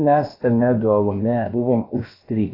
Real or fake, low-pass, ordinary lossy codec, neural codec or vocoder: fake; 5.4 kHz; AAC, 24 kbps; codec, 16 kHz, about 1 kbps, DyCAST, with the encoder's durations